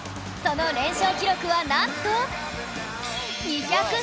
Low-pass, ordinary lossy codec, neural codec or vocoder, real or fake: none; none; none; real